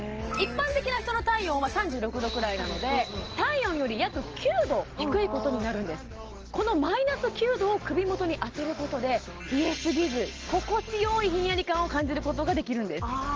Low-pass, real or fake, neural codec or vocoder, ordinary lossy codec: 7.2 kHz; real; none; Opus, 16 kbps